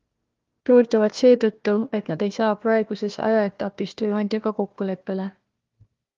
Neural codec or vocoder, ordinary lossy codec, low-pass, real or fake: codec, 16 kHz, 1 kbps, FunCodec, trained on Chinese and English, 50 frames a second; Opus, 32 kbps; 7.2 kHz; fake